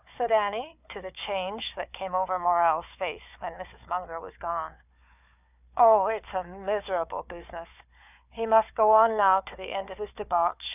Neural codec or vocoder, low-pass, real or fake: codec, 16 kHz, 4 kbps, FunCodec, trained on Chinese and English, 50 frames a second; 3.6 kHz; fake